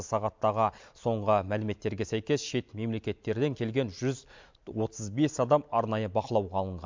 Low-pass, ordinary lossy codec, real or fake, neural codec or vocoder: 7.2 kHz; MP3, 64 kbps; real; none